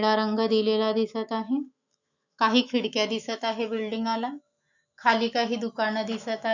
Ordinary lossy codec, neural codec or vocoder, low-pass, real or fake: none; autoencoder, 48 kHz, 128 numbers a frame, DAC-VAE, trained on Japanese speech; 7.2 kHz; fake